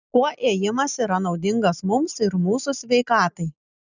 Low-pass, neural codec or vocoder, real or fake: 7.2 kHz; none; real